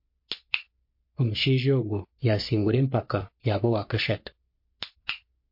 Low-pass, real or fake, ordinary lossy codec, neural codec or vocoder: 5.4 kHz; fake; MP3, 32 kbps; codec, 44.1 kHz, 3.4 kbps, Pupu-Codec